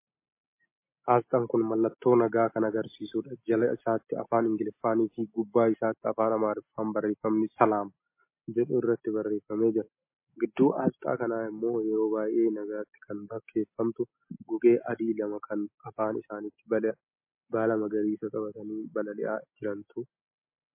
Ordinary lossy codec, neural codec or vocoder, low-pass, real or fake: MP3, 24 kbps; none; 3.6 kHz; real